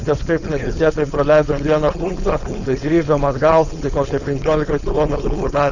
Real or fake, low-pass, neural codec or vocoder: fake; 7.2 kHz; codec, 16 kHz, 4.8 kbps, FACodec